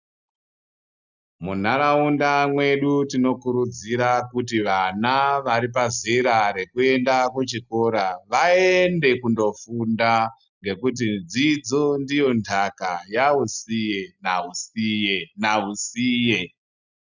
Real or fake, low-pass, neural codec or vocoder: real; 7.2 kHz; none